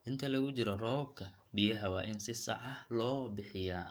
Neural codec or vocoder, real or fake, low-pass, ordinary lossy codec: codec, 44.1 kHz, 7.8 kbps, DAC; fake; none; none